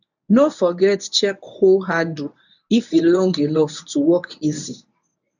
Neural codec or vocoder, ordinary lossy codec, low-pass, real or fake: codec, 24 kHz, 0.9 kbps, WavTokenizer, medium speech release version 1; none; 7.2 kHz; fake